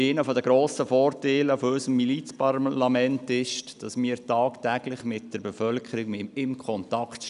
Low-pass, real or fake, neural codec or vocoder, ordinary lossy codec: 10.8 kHz; real; none; none